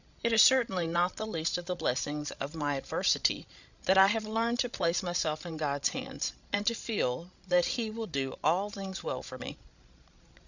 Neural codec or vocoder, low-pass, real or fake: codec, 16 kHz, 16 kbps, FreqCodec, larger model; 7.2 kHz; fake